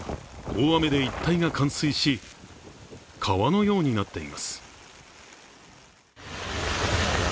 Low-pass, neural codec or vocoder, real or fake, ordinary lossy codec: none; none; real; none